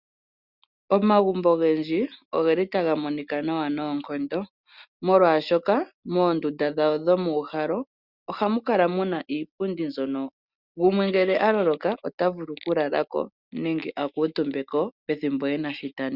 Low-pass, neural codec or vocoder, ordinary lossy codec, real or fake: 5.4 kHz; none; Opus, 64 kbps; real